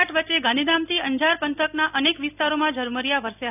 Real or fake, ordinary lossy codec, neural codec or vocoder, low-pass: real; none; none; 3.6 kHz